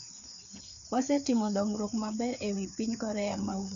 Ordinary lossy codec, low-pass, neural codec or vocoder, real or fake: AAC, 96 kbps; 7.2 kHz; codec, 16 kHz, 4 kbps, FreqCodec, larger model; fake